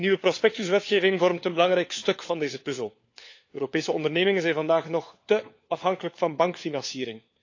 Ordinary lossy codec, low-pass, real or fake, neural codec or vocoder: AAC, 48 kbps; 7.2 kHz; fake; codec, 16 kHz, 4 kbps, FunCodec, trained on LibriTTS, 50 frames a second